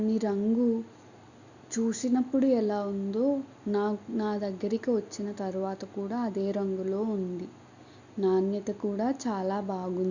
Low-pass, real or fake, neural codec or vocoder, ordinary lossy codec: 7.2 kHz; real; none; Opus, 64 kbps